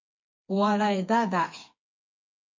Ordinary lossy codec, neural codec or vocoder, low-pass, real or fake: MP3, 48 kbps; codec, 16 kHz, 4 kbps, FreqCodec, smaller model; 7.2 kHz; fake